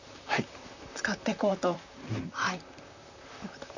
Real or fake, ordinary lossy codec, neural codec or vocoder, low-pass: fake; none; vocoder, 44.1 kHz, 128 mel bands, Pupu-Vocoder; 7.2 kHz